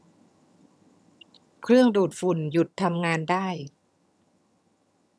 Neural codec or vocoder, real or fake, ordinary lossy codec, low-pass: vocoder, 22.05 kHz, 80 mel bands, HiFi-GAN; fake; none; none